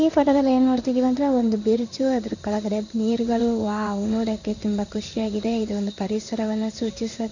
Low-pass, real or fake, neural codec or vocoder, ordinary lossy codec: 7.2 kHz; fake; codec, 16 kHz in and 24 kHz out, 1 kbps, XY-Tokenizer; none